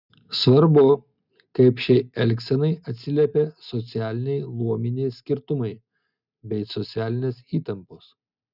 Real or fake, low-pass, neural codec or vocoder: real; 5.4 kHz; none